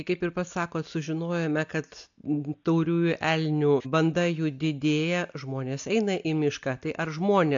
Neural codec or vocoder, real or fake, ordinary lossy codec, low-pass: none; real; AAC, 64 kbps; 7.2 kHz